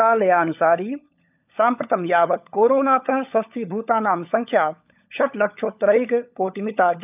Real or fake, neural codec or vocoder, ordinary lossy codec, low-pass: fake; codec, 16 kHz, 16 kbps, FunCodec, trained on LibriTTS, 50 frames a second; none; 3.6 kHz